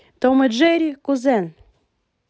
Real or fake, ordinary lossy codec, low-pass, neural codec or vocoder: real; none; none; none